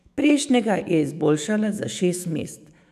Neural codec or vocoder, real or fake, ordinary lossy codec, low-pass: autoencoder, 48 kHz, 128 numbers a frame, DAC-VAE, trained on Japanese speech; fake; none; 14.4 kHz